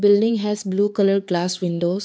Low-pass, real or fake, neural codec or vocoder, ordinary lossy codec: none; fake; codec, 16 kHz, 4 kbps, X-Codec, HuBERT features, trained on LibriSpeech; none